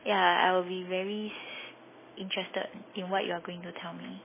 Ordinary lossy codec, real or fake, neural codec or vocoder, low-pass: MP3, 16 kbps; real; none; 3.6 kHz